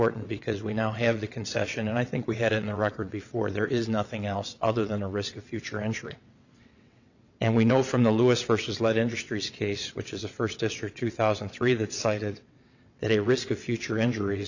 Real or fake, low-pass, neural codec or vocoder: fake; 7.2 kHz; vocoder, 22.05 kHz, 80 mel bands, WaveNeXt